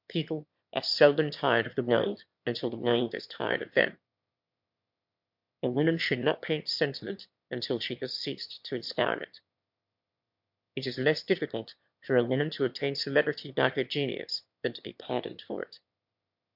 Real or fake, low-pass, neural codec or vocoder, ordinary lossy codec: fake; 5.4 kHz; autoencoder, 22.05 kHz, a latent of 192 numbers a frame, VITS, trained on one speaker; MP3, 48 kbps